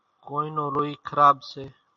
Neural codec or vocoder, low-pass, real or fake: none; 7.2 kHz; real